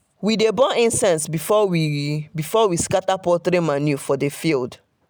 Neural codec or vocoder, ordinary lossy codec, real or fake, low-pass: none; none; real; none